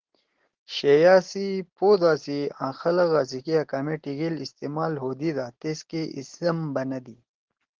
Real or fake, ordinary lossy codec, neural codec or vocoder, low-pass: real; Opus, 16 kbps; none; 7.2 kHz